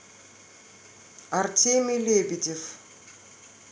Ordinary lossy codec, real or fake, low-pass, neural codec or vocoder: none; real; none; none